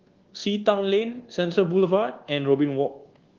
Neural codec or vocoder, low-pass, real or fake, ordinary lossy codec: codec, 24 kHz, 1.2 kbps, DualCodec; 7.2 kHz; fake; Opus, 16 kbps